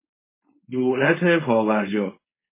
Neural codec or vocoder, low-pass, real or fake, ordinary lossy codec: codec, 16 kHz, 4.8 kbps, FACodec; 3.6 kHz; fake; MP3, 16 kbps